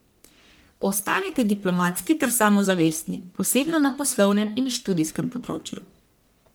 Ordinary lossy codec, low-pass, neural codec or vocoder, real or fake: none; none; codec, 44.1 kHz, 1.7 kbps, Pupu-Codec; fake